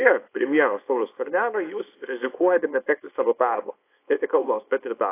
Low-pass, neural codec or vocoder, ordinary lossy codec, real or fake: 3.6 kHz; codec, 24 kHz, 0.9 kbps, WavTokenizer, small release; AAC, 24 kbps; fake